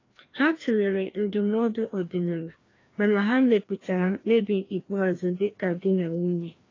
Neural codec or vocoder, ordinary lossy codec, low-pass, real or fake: codec, 16 kHz, 1 kbps, FreqCodec, larger model; AAC, 32 kbps; 7.2 kHz; fake